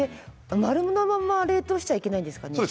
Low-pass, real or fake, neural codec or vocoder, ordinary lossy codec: none; real; none; none